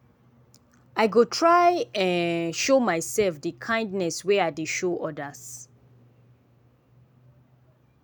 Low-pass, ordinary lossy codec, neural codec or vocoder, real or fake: none; none; none; real